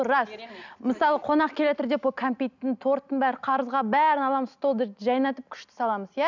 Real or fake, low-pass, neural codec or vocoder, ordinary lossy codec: real; 7.2 kHz; none; none